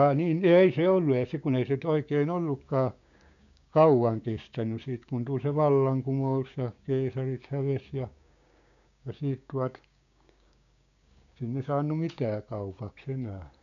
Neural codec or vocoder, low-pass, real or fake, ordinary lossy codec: codec, 16 kHz, 6 kbps, DAC; 7.2 kHz; fake; none